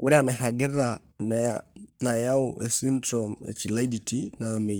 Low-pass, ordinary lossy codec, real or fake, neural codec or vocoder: none; none; fake; codec, 44.1 kHz, 3.4 kbps, Pupu-Codec